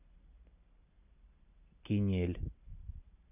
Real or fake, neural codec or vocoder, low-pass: real; none; 3.6 kHz